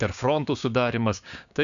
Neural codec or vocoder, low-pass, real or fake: codec, 16 kHz, 6 kbps, DAC; 7.2 kHz; fake